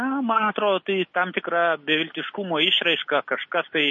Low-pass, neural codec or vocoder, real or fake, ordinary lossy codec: 7.2 kHz; none; real; MP3, 32 kbps